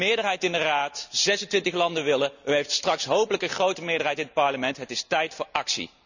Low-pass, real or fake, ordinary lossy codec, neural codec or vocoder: 7.2 kHz; real; none; none